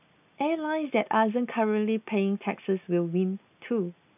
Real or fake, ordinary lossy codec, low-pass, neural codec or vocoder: real; none; 3.6 kHz; none